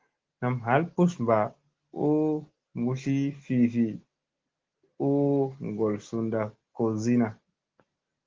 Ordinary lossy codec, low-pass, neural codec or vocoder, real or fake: Opus, 16 kbps; 7.2 kHz; none; real